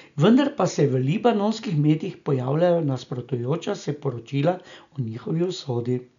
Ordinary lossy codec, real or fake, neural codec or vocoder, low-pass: none; real; none; 7.2 kHz